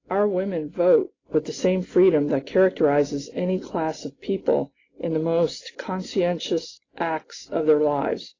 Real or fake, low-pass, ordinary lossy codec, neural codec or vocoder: real; 7.2 kHz; AAC, 32 kbps; none